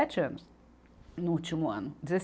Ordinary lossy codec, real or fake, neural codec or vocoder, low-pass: none; real; none; none